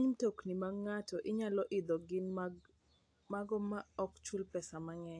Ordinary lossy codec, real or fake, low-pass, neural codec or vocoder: AAC, 48 kbps; real; 9.9 kHz; none